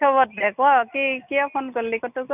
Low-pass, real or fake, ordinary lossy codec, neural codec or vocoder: 3.6 kHz; real; none; none